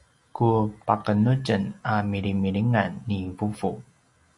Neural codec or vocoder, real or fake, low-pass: none; real; 10.8 kHz